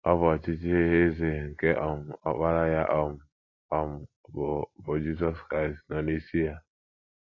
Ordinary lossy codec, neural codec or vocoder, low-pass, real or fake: AAC, 32 kbps; none; 7.2 kHz; real